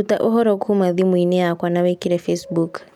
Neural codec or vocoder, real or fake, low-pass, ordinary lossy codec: none; real; 19.8 kHz; none